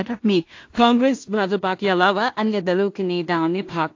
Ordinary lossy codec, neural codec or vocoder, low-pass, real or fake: AAC, 48 kbps; codec, 16 kHz in and 24 kHz out, 0.4 kbps, LongCat-Audio-Codec, two codebook decoder; 7.2 kHz; fake